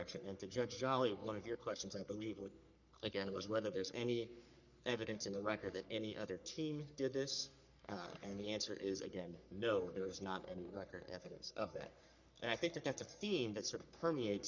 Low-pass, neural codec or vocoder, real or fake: 7.2 kHz; codec, 44.1 kHz, 3.4 kbps, Pupu-Codec; fake